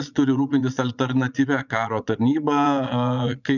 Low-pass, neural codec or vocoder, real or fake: 7.2 kHz; vocoder, 22.05 kHz, 80 mel bands, Vocos; fake